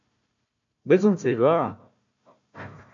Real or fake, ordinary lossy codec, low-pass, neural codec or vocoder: fake; AAC, 64 kbps; 7.2 kHz; codec, 16 kHz, 1 kbps, FunCodec, trained on Chinese and English, 50 frames a second